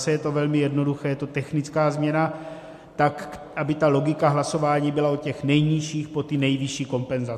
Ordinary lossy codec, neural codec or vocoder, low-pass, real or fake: MP3, 64 kbps; none; 14.4 kHz; real